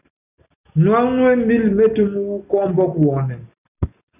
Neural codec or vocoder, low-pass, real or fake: none; 3.6 kHz; real